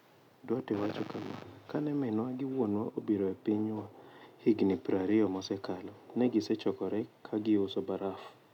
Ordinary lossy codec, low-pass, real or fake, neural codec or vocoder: none; 19.8 kHz; real; none